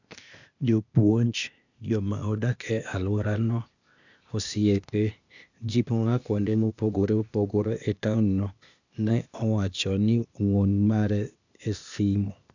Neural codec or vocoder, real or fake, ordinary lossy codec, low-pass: codec, 16 kHz, 0.8 kbps, ZipCodec; fake; none; 7.2 kHz